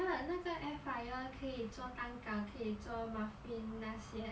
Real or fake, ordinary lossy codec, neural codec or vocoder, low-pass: real; none; none; none